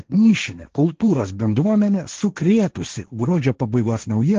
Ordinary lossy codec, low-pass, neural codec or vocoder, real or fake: Opus, 16 kbps; 7.2 kHz; codec, 16 kHz, 1.1 kbps, Voila-Tokenizer; fake